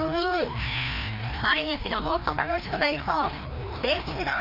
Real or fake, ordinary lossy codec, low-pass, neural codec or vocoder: fake; none; 5.4 kHz; codec, 16 kHz, 1 kbps, FreqCodec, larger model